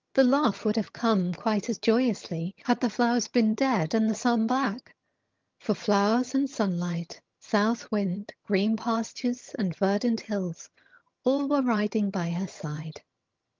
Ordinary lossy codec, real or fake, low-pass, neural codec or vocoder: Opus, 24 kbps; fake; 7.2 kHz; vocoder, 22.05 kHz, 80 mel bands, HiFi-GAN